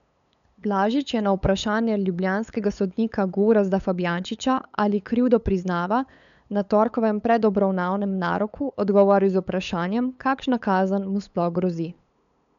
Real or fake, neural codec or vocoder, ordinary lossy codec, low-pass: fake; codec, 16 kHz, 8 kbps, FunCodec, trained on LibriTTS, 25 frames a second; none; 7.2 kHz